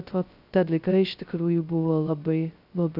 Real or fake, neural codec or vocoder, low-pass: fake; codec, 16 kHz, 0.2 kbps, FocalCodec; 5.4 kHz